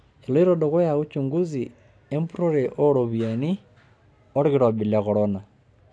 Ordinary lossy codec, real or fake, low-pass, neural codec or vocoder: none; real; none; none